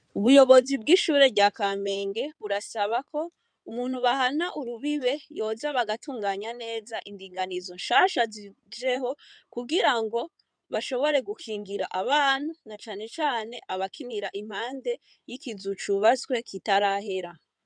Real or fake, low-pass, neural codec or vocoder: fake; 9.9 kHz; codec, 16 kHz in and 24 kHz out, 2.2 kbps, FireRedTTS-2 codec